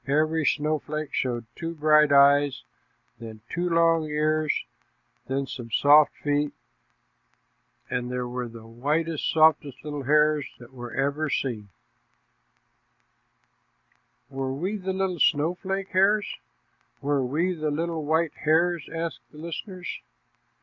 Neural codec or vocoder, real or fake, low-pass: none; real; 7.2 kHz